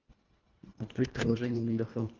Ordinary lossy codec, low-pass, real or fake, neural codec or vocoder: Opus, 32 kbps; 7.2 kHz; fake; codec, 24 kHz, 1.5 kbps, HILCodec